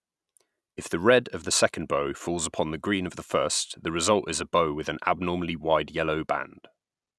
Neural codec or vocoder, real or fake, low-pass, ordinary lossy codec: none; real; none; none